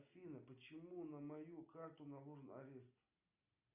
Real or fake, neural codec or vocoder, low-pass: real; none; 3.6 kHz